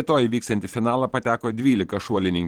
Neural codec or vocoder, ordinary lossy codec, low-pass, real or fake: vocoder, 44.1 kHz, 128 mel bands every 512 samples, BigVGAN v2; Opus, 16 kbps; 19.8 kHz; fake